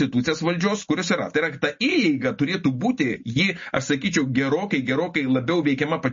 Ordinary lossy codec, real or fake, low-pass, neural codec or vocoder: MP3, 32 kbps; real; 7.2 kHz; none